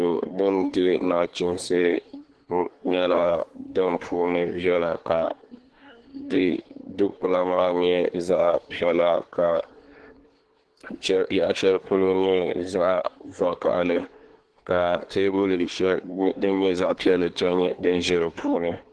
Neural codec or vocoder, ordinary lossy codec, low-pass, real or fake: codec, 24 kHz, 1 kbps, SNAC; Opus, 16 kbps; 10.8 kHz; fake